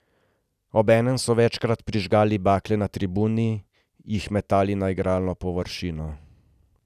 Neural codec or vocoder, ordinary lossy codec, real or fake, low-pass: none; none; real; 14.4 kHz